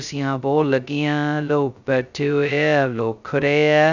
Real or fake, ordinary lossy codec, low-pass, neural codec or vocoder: fake; none; 7.2 kHz; codec, 16 kHz, 0.2 kbps, FocalCodec